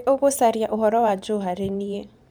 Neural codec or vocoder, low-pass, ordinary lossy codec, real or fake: vocoder, 44.1 kHz, 128 mel bands every 512 samples, BigVGAN v2; none; none; fake